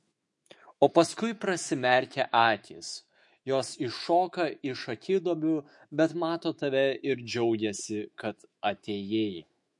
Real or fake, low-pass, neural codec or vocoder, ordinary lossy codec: fake; 10.8 kHz; autoencoder, 48 kHz, 128 numbers a frame, DAC-VAE, trained on Japanese speech; MP3, 48 kbps